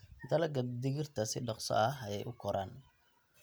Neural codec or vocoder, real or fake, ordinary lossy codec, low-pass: none; real; none; none